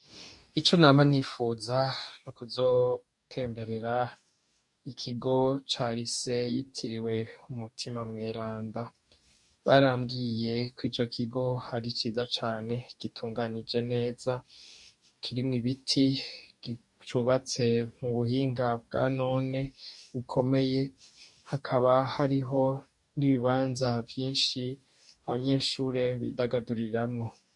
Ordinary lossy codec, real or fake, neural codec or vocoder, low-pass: MP3, 64 kbps; fake; codec, 44.1 kHz, 2.6 kbps, DAC; 10.8 kHz